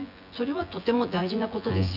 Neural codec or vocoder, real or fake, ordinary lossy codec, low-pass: vocoder, 24 kHz, 100 mel bands, Vocos; fake; none; 5.4 kHz